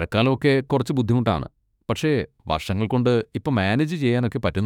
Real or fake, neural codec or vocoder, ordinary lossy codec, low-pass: fake; autoencoder, 48 kHz, 32 numbers a frame, DAC-VAE, trained on Japanese speech; none; 19.8 kHz